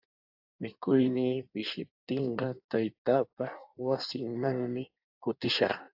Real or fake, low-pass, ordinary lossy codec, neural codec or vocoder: fake; 5.4 kHz; AAC, 48 kbps; codec, 16 kHz in and 24 kHz out, 1.1 kbps, FireRedTTS-2 codec